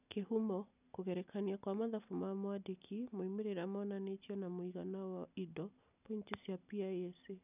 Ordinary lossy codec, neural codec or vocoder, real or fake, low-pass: none; none; real; 3.6 kHz